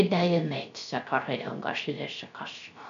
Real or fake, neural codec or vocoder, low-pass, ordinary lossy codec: fake; codec, 16 kHz, 0.3 kbps, FocalCodec; 7.2 kHz; MP3, 96 kbps